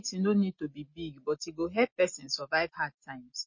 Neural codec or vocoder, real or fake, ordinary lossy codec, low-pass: none; real; MP3, 32 kbps; 7.2 kHz